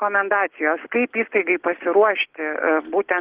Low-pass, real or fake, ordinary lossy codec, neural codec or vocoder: 3.6 kHz; real; Opus, 16 kbps; none